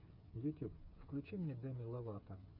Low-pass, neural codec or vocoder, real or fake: 5.4 kHz; codec, 16 kHz, 8 kbps, FreqCodec, smaller model; fake